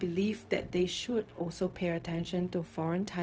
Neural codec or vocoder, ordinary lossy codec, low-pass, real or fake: codec, 16 kHz, 0.4 kbps, LongCat-Audio-Codec; none; none; fake